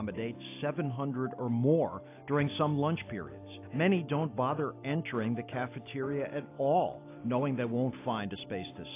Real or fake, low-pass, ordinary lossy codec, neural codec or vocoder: real; 3.6 kHz; AAC, 24 kbps; none